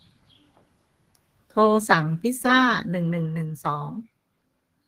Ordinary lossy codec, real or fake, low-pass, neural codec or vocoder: Opus, 16 kbps; fake; 14.4 kHz; codec, 32 kHz, 1.9 kbps, SNAC